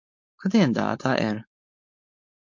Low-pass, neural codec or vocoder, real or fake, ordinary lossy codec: 7.2 kHz; codec, 16 kHz, 4.8 kbps, FACodec; fake; MP3, 48 kbps